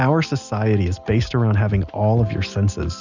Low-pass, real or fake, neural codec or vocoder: 7.2 kHz; real; none